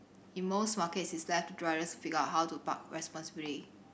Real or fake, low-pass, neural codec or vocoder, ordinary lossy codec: real; none; none; none